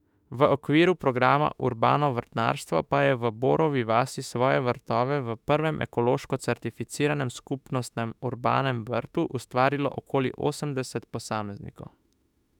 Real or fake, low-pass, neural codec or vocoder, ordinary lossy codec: fake; 19.8 kHz; autoencoder, 48 kHz, 32 numbers a frame, DAC-VAE, trained on Japanese speech; none